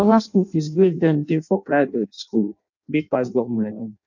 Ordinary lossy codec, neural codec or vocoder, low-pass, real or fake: none; codec, 16 kHz in and 24 kHz out, 0.6 kbps, FireRedTTS-2 codec; 7.2 kHz; fake